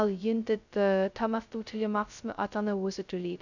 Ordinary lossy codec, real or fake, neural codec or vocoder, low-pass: none; fake; codec, 16 kHz, 0.2 kbps, FocalCodec; 7.2 kHz